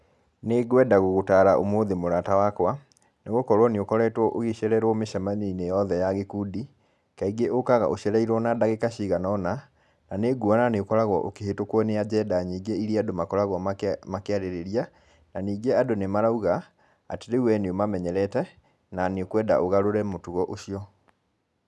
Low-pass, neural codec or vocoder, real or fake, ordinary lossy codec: none; none; real; none